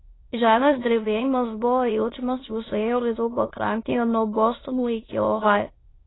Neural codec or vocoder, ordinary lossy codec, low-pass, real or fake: autoencoder, 22.05 kHz, a latent of 192 numbers a frame, VITS, trained on many speakers; AAC, 16 kbps; 7.2 kHz; fake